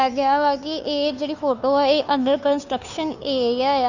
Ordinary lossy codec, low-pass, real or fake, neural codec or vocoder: none; 7.2 kHz; fake; codec, 16 kHz, 2 kbps, FunCodec, trained on Chinese and English, 25 frames a second